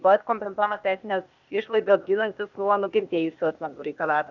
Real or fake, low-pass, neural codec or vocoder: fake; 7.2 kHz; codec, 16 kHz, 0.8 kbps, ZipCodec